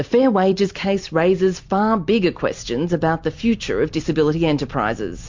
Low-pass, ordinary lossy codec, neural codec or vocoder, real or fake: 7.2 kHz; MP3, 48 kbps; vocoder, 44.1 kHz, 128 mel bands every 256 samples, BigVGAN v2; fake